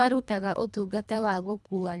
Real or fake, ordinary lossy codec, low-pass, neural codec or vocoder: fake; none; none; codec, 24 kHz, 1.5 kbps, HILCodec